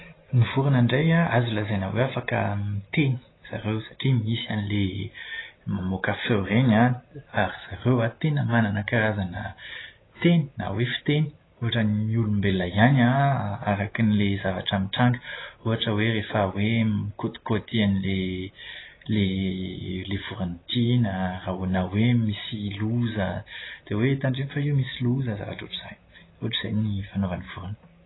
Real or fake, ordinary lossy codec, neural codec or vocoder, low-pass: real; AAC, 16 kbps; none; 7.2 kHz